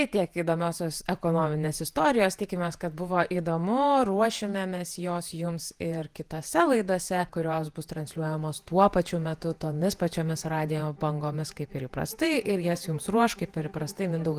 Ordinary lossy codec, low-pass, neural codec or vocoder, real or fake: Opus, 24 kbps; 14.4 kHz; vocoder, 48 kHz, 128 mel bands, Vocos; fake